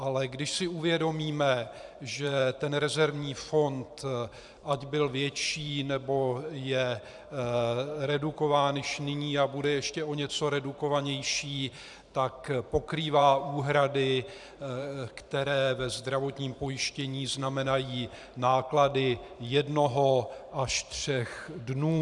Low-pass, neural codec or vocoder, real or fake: 10.8 kHz; vocoder, 44.1 kHz, 128 mel bands every 512 samples, BigVGAN v2; fake